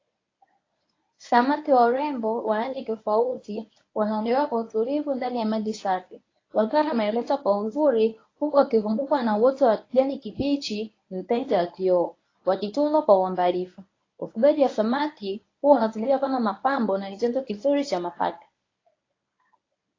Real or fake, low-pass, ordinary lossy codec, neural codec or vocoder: fake; 7.2 kHz; AAC, 32 kbps; codec, 24 kHz, 0.9 kbps, WavTokenizer, medium speech release version 1